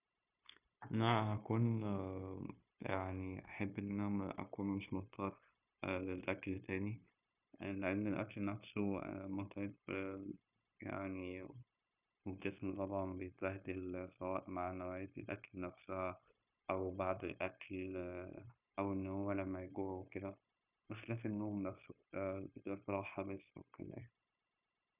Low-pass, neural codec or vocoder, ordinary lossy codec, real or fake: 3.6 kHz; codec, 16 kHz, 0.9 kbps, LongCat-Audio-Codec; none; fake